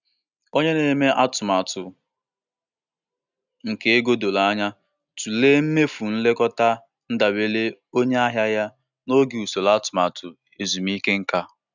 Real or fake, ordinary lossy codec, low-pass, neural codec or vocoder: real; none; 7.2 kHz; none